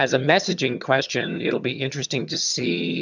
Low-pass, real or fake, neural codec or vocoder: 7.2 kHz; fake; vocoder, 22.05 kHz, 80 mel bands, HiFi-GAN